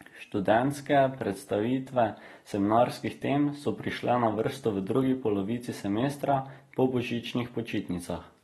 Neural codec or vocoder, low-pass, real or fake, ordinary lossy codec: none; 19.8 kHz; real; AAC, 32 kbps